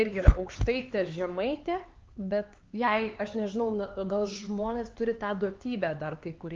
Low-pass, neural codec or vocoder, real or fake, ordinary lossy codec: 7.2 kHz; codec, 16 kHz, 4 kbps, X-Codec, HuBERT features, trained on LibriSpeech; fake; Opus, 32 kbps